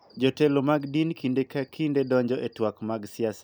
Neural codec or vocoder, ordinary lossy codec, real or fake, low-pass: none; none; real; none